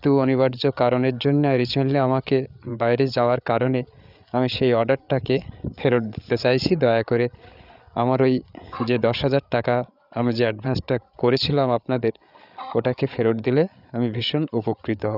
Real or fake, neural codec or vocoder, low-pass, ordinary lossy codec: fake; codec, 16 kHz, 8 kbps, FreqCodec, larger model; 5.4 kHz; none